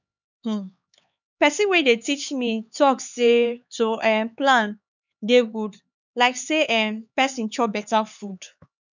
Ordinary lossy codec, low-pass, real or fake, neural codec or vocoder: none; 7.2 kHz; fake; codec, 16 kHz, 4 kbps, X-Codec, HuBERT features, trained on LibriSpeech